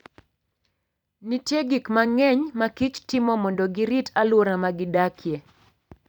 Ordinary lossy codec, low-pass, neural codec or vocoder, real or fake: none; 19.8 kHz; vocoder, 44.1 kHz, 128 mel bands every 256 samples, BigVGAN v2; fake